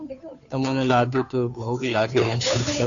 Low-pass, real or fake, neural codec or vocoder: 7.2 kHz; fake; codec, 16 kHz, 2 kbps, FunCodec, trained on Chinese and English, 25 frames a second